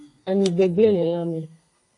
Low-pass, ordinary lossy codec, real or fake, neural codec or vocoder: 10.8 kHz; AAC, 48 kbps; fake; codec, 32 kHz, 1.9 kbps, SNAC